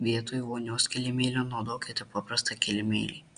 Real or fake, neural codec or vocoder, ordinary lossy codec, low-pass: real; none; Opus, 64 kbps; 9.9 kHz